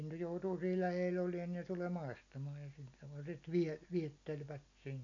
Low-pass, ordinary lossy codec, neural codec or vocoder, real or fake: 7.2 kHz; none; none; real